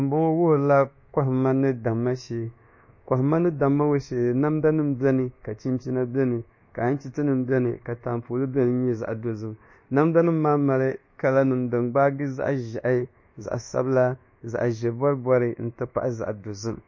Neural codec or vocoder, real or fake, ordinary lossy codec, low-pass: codec, 24 kHz, 1.2 kbps, DualCodec; fake; MP3, 32 kbps; 7.2 kHz